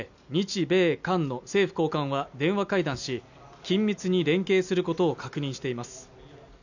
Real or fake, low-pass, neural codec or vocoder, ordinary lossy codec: real; 7.2 kHz; none; none